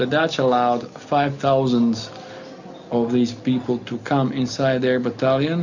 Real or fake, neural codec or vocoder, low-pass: real; none; 7.2 kHz